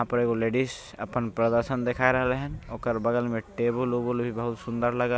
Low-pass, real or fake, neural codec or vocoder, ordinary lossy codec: none; real; none; none